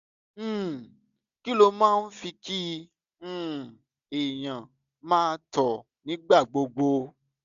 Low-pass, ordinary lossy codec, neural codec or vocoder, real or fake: 7.2 kHz; none; none; real